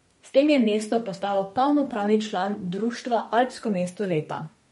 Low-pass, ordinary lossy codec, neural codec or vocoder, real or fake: 14.4 kHz; MP3, 48 kbps; codec, 32 kHz, 1.9 kbps, SNAC; fake